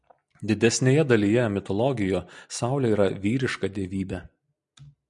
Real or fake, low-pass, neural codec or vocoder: real; 10.8 kHz; none